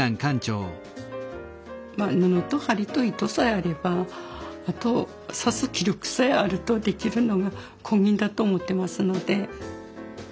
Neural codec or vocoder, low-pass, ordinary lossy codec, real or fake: none; none; none; real